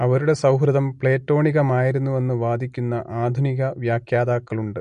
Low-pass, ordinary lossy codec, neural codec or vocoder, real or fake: 9.9 kHz; MP3, 48 kbps; none; real